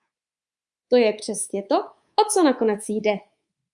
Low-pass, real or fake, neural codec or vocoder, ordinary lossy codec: 10.8 kHz; fake; codec, 24 kHz, 3.1 kbps, DualCodec; Opus, 64 kbps